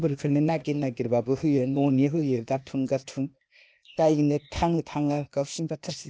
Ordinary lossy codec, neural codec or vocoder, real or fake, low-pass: none; codec, 16 kHz, 0.8 kbps, ZipCodec; fake; none